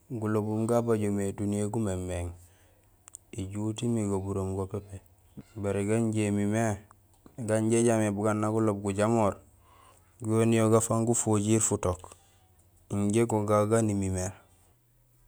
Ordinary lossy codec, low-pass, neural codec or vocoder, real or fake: none; none; none; real